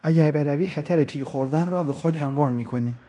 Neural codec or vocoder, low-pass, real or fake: codec, 16 kHz in and 24 kHz out, 0.9 kbps, LongCat-Audio-Codec, fine tuned four codebook decoder; 10.8 kHz; fake